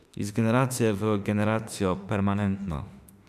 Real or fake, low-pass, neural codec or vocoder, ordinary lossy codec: fake; 14.4 kHz; autoencoder, 48 kHz, 32 numbers a frame, DAC-VAE, trained on Japanese speech; none